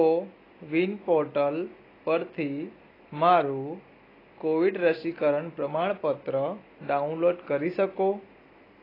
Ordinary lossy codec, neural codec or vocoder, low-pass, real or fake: AAC, 24 kbps; none; 5.4 kHz; real